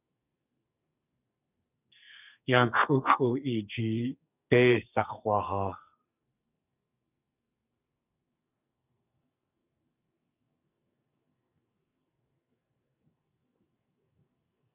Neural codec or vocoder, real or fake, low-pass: codec, 44.1 kHz, 2.6 kbps, SNAC; fake; 3.6 kHz